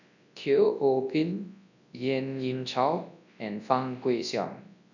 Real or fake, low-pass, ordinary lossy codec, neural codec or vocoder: fake; 7.2 kHz; none; codec, 24 kHz, 0.9 kbps, WavTokenizer, large speech release